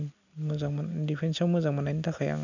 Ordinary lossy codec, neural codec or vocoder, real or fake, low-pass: none; none; real; 7.2 kHz